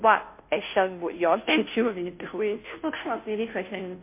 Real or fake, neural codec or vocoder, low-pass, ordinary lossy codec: fake; codec, 16 kHz, 0.5 kbps, FunCodec, trained on Chinese and English, 25 frames a second; 3.6 kHz; MP3, 24 kbps